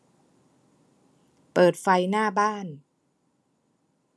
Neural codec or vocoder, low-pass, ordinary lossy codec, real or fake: none; none; none; real